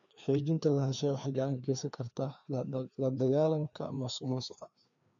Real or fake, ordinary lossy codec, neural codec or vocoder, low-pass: fake; none; codec, 16 kHz, 2 kbps, FreqCodec, larger model; 7.2 kHz